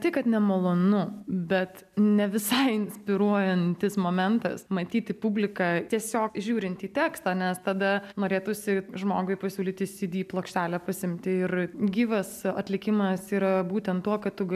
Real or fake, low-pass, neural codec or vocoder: real; 14.4 kHz; none